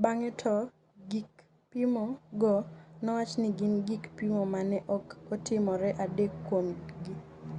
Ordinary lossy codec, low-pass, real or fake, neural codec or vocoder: Opus, 64 kbps; 10.8 kHz; real; none